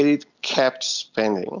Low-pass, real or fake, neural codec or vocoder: 7.2 kHz; real; none